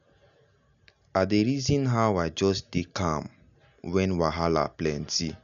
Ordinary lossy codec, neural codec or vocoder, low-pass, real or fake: none; none; 7.2 kHz; real